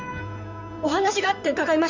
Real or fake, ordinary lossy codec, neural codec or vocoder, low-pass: fake; none; codec, 16 kHz in and 24 kHz out, 2.2 kbps, FireRedTTS-2 codec; 7.2 kHz